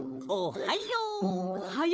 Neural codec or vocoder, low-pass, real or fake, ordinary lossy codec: codec, 16 kHz, 4 kbps, FunCodec, trained on Chinese and English, 50 frames a second; none; fake; none